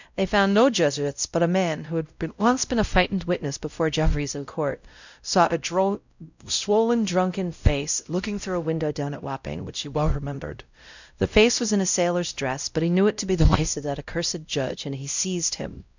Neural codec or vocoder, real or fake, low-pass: codec, 16 kHz, 0.5 kbps, X-Codec, WavLM features, trained on Multilingual LibriSpeech; fake; 7.2 kHz